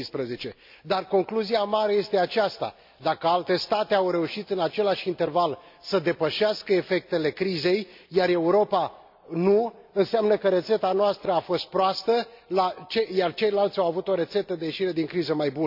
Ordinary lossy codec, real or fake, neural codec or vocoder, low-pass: MP3, 48 kbps; real; none; 5.4 kHz